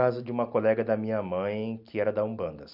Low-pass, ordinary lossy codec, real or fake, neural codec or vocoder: 5.4 kHz; Opus, 64 kbps; real; none